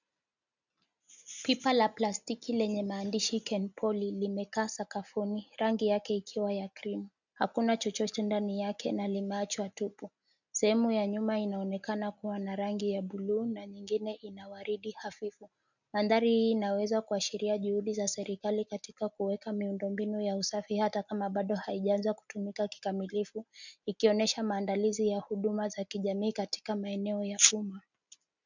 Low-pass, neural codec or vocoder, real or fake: 7.2 kHz; none; real